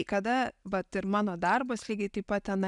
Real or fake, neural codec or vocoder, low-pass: real; none; 10.8 kHz